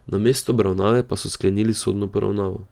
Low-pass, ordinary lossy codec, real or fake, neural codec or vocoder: 19.8 kHz; Opus, 24 kbps; real; none